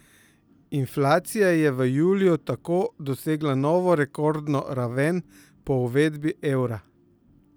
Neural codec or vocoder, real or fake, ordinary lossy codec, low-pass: none; real; none; none